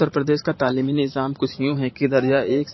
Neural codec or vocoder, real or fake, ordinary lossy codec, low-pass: vocoder, 44.1 kHz, 128 mel bands every 512 samples, BigVGAN v2; fake; MP3, 24 kbps; 7.2 kHz